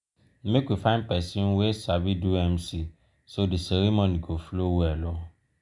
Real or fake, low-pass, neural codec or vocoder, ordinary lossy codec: real; 10.8 kHz; none; none